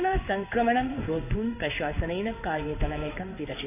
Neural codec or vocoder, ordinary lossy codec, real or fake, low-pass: codec, 16 kHz in and 24 kHz out, 1 kbps, XY-Tokenizer; none; fake; 3.6 kHz